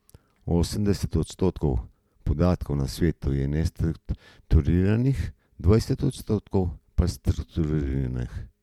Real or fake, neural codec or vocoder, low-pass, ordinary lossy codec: real; none; 19.8 kHz; MP3, 96 kbps